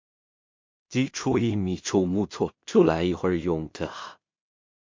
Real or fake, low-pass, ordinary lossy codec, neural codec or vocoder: fake; 7.2 kHz; MP3, 48 kbps; codec, 16 kHz in and 24 kHz out, 0.4 kbps, LongCat-Audio-Codec, two codebook decoder